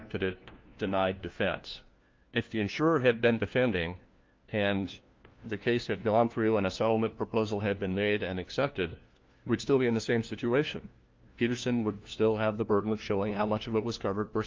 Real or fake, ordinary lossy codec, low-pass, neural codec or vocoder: fake; Opus, 32 kbps; 7.2 kHz; codec, 16 kHz, 1 kbps, FunCodec, trained on LibriTTS, 50 frames a second